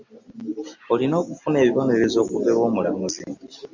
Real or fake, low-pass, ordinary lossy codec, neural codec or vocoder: real; 7.2 kHz; MP3, 48 kbps; none